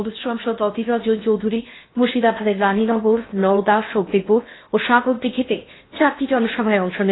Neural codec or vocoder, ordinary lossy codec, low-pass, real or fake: codec, 16 kHz in and 24 kHz out, 0.6 kbps, FocalCodec, streaming, 2048 codes; AAC, 16 kbps; 7.2 kHz; fake